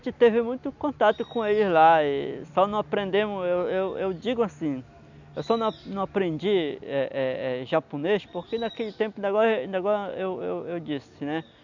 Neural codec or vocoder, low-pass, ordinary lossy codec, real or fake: none; 7.2 kHz; none; real